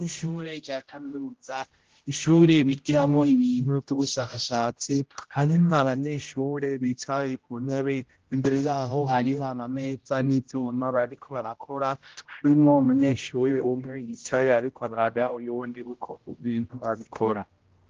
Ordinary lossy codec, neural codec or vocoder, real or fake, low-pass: Opus, 16 kbps; codec, 16 kHz, 0.5 kbps, X-Codec, HuBERT features, trained on general audio; fake; 7.2 kHz